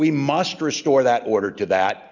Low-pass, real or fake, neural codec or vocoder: 7.2 kHz; real; none